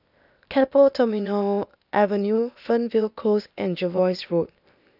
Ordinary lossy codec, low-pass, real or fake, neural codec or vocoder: none; 5.4 kHz; fake; codec, 16 kHz, 0.8 kbps, ZipCodec